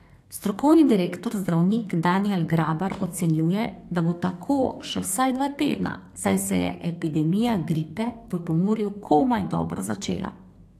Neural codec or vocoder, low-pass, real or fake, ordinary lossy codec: codec, 32 kHz, 1.9 kbps, SNAC; 14.4 kHz; fake; AAC, 64 kbps